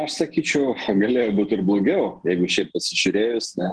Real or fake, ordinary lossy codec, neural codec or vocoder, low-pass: real; Opus, 16 kbps; none; 10.8 kHz